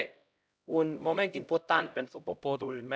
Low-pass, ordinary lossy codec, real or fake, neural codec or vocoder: none; none; fake; codec, 16 kHz, 0.5 kbps, X-Codec, HuBERT features, trained on LibriSpeech